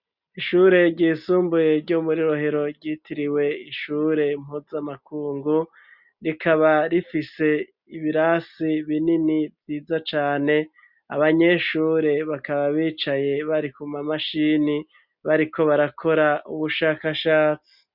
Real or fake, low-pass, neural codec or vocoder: real; 5.4 kHz; none